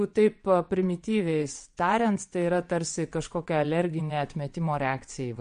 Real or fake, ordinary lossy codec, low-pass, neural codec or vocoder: fake; MP3, 48 kbps; 9.9 kHz; vocoder, 22.05 kHz, 80 mel bands, WaveNeXt